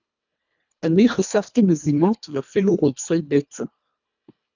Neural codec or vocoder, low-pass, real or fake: codec, 24 kHz, 1.5 kbps, HILCodec; 7.2 kHz; fake